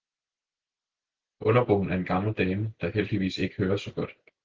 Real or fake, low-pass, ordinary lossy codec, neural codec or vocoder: real; 7.2 kHz; Opus, 16 kbps; none